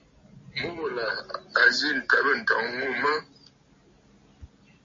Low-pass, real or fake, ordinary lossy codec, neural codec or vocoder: 7.2 kHz; real; MP3, 32 kbps; none